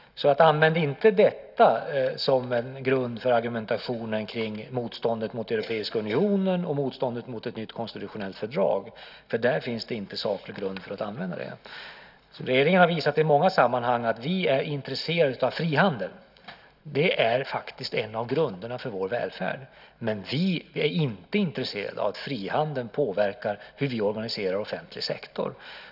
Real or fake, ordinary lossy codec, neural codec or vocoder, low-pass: real; none; none; 5.4 kHz